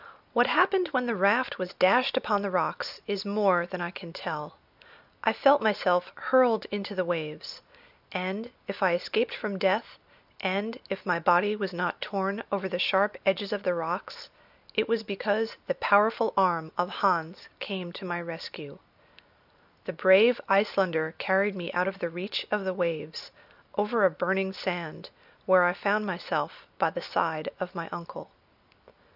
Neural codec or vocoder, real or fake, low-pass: none; real; 5.4 kHz